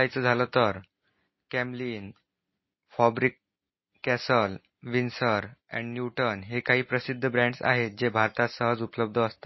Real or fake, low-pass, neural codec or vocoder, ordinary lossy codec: real; 7.2 kHz; none; MP3, 24 kbps